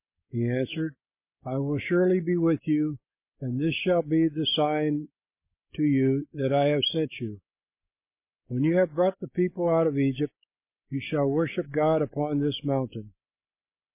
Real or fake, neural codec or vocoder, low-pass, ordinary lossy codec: real; none; 3.6 kHz; MP3, 24 kbps